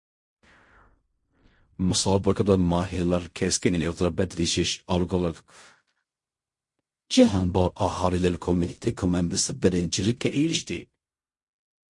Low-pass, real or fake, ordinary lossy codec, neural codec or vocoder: 10.8 kHz; fake; MP3, 48 kbps; codec, 16 kHz in and 24 kHz out, 0.4 kbps, LongCat-Audio-Codec, fine tuned four codebook decoder